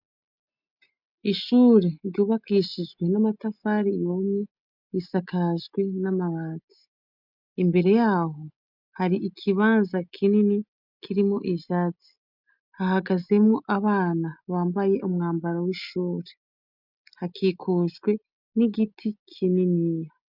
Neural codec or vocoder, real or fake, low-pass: none; real; 5.4 kHz